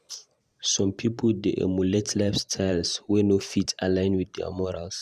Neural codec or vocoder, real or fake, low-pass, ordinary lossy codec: vocoder, 44.1 kHz, 128 mel bands every 512 samples, BigVGAN v2; fake; 14.4 kHz; Opus, 64 kbps